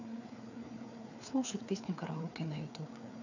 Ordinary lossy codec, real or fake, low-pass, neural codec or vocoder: none; fake; 7.2 kHz; codec, 16 kHz, 4 kbps, FreqCodec, larger model